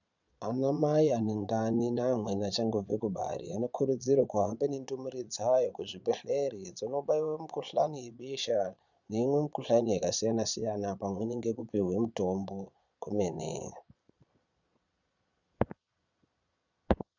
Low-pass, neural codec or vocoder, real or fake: 7.2 kHz; vocoder, 22.05 kHz, 80 mel bands, Vocos; fake